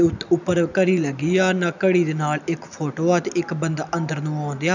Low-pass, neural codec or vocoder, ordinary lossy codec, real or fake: 7.2 kHz; none; none; real